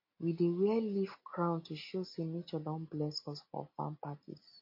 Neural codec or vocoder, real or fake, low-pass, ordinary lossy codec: none; real; 5.4 kHz; MP3, 32 kbps